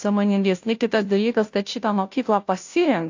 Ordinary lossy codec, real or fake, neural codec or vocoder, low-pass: AAC, 48 kbps; fake; codec, 16 kHz, 0.5 kbps, FunCodec, trained on Chinese and English, 25 frames a second; 7.2 kHz